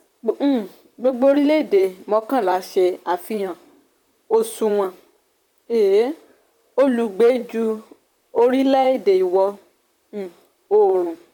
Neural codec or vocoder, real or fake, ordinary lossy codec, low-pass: vocoder, 44.1 kHz, 128 mel bands, Pupu-Vocoder; fake; none; 19.8 kHz